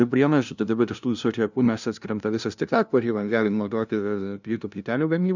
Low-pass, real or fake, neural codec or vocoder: 7.2 kHz; fake; codec, 16 kHz, 0.5 kbps, FunCodec, trained on LibriTTS, 25 frames a second